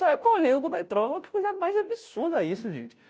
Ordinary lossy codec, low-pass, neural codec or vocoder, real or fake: none; none; codec, 16 kHz, 0.5 kbps, FunCodec, trained on Chinese and English, 25 frames a second; fake